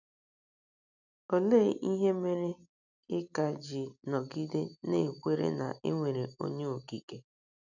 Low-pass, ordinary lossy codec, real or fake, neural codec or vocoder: 7.2 kHz; none; real; none